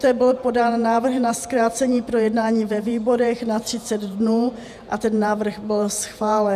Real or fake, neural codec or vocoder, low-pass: fake; vocoder, 48 kHz, 128 mel bands, Vocos; 14.4 kHz